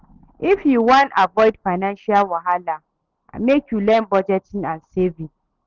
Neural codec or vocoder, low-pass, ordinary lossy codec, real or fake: none; 7.2 kHz; Opus, 16 kbps; real